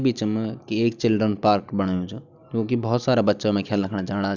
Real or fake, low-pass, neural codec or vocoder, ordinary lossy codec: real; 7.2 kHz; none; none